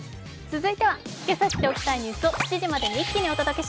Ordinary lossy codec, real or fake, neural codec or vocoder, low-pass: none; real; none; none